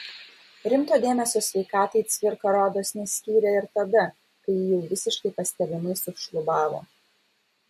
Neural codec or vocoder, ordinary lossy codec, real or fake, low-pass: none; MP3, 64 kbps; real; 14.4 kHz